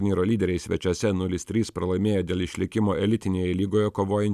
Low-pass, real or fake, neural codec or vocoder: 14.4 kHz; real; none